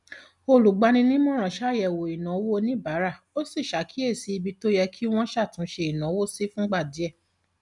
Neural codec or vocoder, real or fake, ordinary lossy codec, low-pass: none; real; none; 10.8 kHz